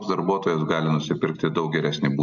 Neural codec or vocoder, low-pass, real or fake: none; 7.2 kHz; real